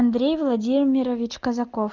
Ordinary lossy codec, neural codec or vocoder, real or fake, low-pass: Opus, 24 kbps; none; real; 7.2 kHz